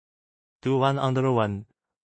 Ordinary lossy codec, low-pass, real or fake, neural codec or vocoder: MP3, 32 kbps; 9.9 kHz; fake; codec, 16 kHz in and 24 kHz out, 0.4 kbps, LongCat-Audio-Codec, two codebook decoder